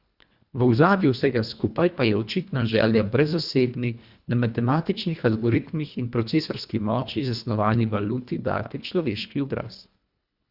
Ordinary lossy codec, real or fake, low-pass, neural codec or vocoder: Opus, 64 kbps; fake; 5.4 kHz; codec, 24 kHz, 1.5 kbps, HILCodec